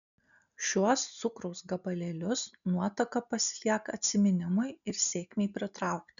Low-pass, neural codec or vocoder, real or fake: 7.2 kHz; none; real